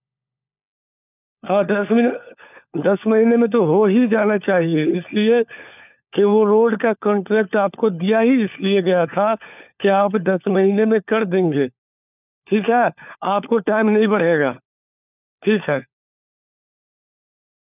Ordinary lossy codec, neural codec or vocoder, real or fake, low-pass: none; codec, 16 kHz, 4 kbps, FunCodec, trained on LibriTTS, 50 frames a second; fake; 3.6 kHz